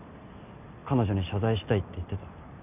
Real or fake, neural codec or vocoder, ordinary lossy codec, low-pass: real; none; none; 3.6 kHz